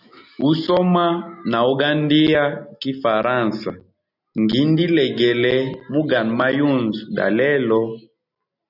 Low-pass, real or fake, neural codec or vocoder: 5.4 kHz; real; none